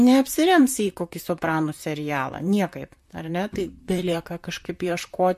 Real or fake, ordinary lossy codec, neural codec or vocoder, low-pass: fake; MP3, 64 kbps; vocoder, 44.1 kHz, 128 mel bands, Pupu-Vocoder; 14.4 kHz